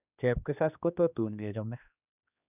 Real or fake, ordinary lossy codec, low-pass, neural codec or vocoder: fake; none; 3.6 kHz; codec, 16 kHz, 2 kbps, X-Codec, HuBERT features, trained on general audio